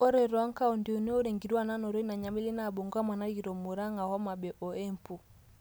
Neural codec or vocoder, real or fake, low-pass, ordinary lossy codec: none; real; none; none